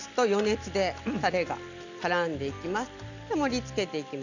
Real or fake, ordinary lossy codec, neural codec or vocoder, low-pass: real; none; none; 7.2 kHz